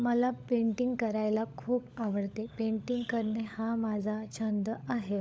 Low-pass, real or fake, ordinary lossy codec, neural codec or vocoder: none; fake; none; codec, 16 kHz, 16 kbps, FunCodec, trained on LibriTTS, 50 frames a second